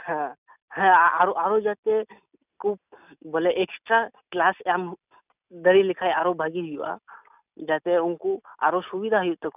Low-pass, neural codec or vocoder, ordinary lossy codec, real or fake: 3.6 kHz; none; none; real